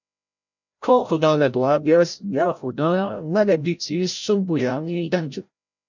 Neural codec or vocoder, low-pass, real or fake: codec, 16 kHz, 0.5 kbps, FreqCodec, larger model; 7.2 kHz; fake